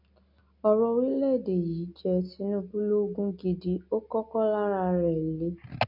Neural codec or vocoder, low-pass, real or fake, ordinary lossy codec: none; 5.4 kHz; real; none